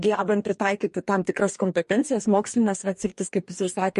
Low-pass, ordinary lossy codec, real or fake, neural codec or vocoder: 14.4 kHz; MP3, 48 kbps; fake; codec, 44.1 kHz, 2.6 kbps, DAC